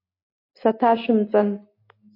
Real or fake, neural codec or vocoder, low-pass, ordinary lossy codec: real; none; 5.4 kHz; MP3, 32 kbps